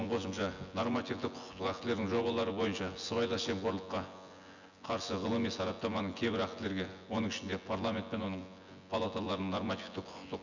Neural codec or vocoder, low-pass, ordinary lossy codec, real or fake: vocoder, 24 kHz, 100 mel bands, Vocos; 7.2 kHz; none; fake